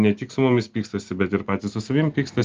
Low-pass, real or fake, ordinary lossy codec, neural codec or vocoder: 7.2 kHz; real; Opus, 32 kbps; none